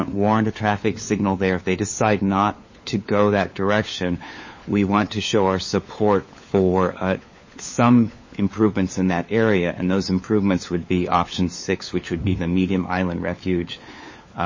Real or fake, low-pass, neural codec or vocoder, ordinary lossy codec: fake; 7.2 kHz; codec, 16 kHz, 4 kbps, FunCodec, trained on LibriTTS, 50 frames a second; MP3, 32 kbps